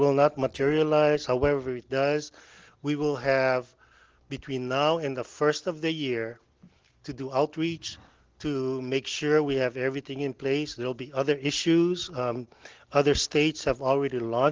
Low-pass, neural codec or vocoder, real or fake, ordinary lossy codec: 7.2 kHz; none; real; Opus, 16 kbps